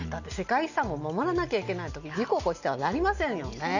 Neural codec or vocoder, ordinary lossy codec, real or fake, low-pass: none; none; real; 7.2 kHz